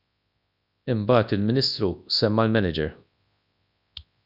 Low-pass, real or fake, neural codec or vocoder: 5.4 kHz; fake; codec, 24 kHz, 0.9 kbps, WavTokenizer, large speech release